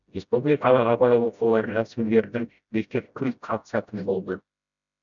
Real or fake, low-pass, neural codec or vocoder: fake; 7.2 kHz; codec, 16 kHz, 0.5 kbps, FreqCodec, smaller model